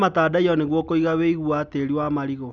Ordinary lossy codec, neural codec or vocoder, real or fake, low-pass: none; none; real; 7.2 kHz